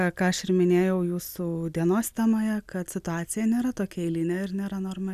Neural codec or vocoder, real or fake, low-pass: none; real; 14.4 kHz